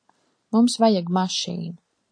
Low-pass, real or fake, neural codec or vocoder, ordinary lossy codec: 9.9 kHz; real; none; AAC, 48 kbps